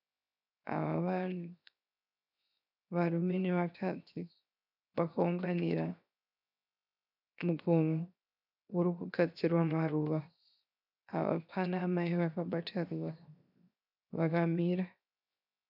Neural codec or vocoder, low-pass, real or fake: codec, 16 kHz, 0.7 kbps, FocalCodec; 5.4 kHz; fake